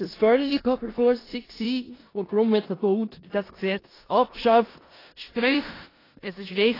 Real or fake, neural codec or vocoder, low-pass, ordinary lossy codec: fake; codec, 16 kHz in and 24 kHz out, 0.4 kbps, LongCat-Audio-Codec, four codebook decoder; 5.4 kHz; AAC, 24 kbps